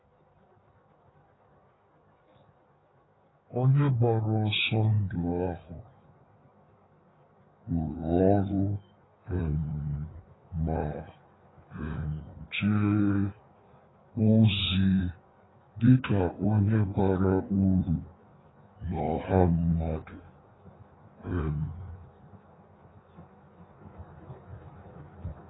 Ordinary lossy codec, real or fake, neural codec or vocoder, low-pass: AAC, 16 kbps; fake; codec, 16 kHz in and 24 kHz out, 1.1 kbps, FireRedTTS-2 codec; 7.2 kHz